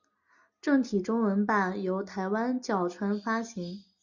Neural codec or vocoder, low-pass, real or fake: none; 7.2 kHz; real